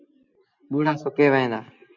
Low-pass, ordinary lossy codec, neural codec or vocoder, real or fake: 7.2 kHz; MP3, 48 kbps; none; real